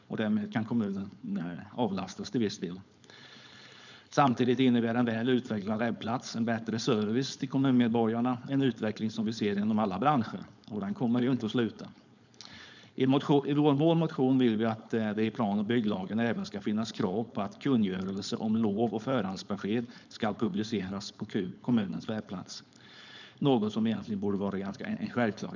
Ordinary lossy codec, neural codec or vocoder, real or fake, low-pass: none; codec, 16 kHz, 4.8 kbps, FACodec; fake; 7.2 kHz